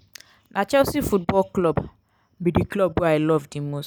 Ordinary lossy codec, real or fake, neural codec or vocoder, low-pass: none; real; none; none